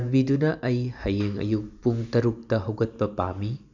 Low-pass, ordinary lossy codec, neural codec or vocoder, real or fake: 7.2 kHz; none; none; real